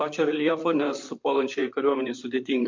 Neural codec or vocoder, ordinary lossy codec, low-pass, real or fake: vocoder, 44.1 kHz, 128 mel bands, Pupu-Vocoder; MP3, 48 kbps; 7.2 kHz; fake